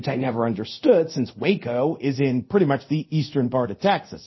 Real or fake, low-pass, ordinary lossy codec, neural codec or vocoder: fake; 7.2 kHz; MP3, 24 kbps; codec, 24 kHz, 0.5 kbps, DualCodec